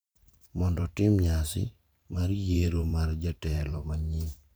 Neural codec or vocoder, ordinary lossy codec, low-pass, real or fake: vocoder, 44.1 kHz, 128 mel bands every 512 samples, BigVGAN v2; none; none; fake